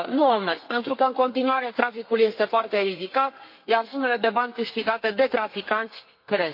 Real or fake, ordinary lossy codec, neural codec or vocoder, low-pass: fake; MP3, 32 kbps; codec, 32 kHz, 1.9 kbps, SNAC; 5.4 kHz